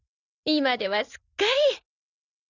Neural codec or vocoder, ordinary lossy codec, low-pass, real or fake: none; none; 7.2 kHz; real